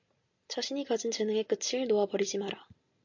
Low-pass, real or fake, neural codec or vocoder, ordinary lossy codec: 7.2 kHz; real; none; AAC, 48 kbps